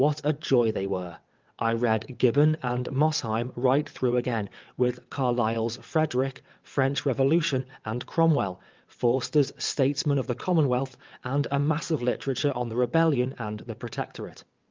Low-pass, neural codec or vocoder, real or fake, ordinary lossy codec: 7.2 kHz; vocoder, 22.05 kHz, 80 mel bands, WaveNeXt; fake; Opus, 24 kbps